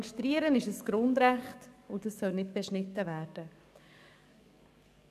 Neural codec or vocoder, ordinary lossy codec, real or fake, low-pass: codec, 44.1 kHz, 7.8 kbps, DAC; none; fake; 14.4 kHz